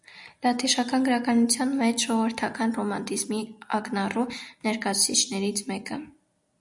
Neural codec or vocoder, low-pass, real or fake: none; 10.8 kHz; real